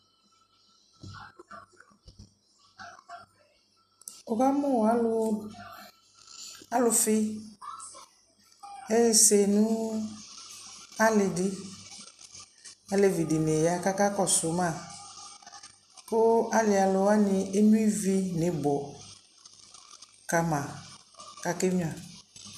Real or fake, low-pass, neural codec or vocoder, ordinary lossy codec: real; 14.4 kHz; none; MP3, 96 kbps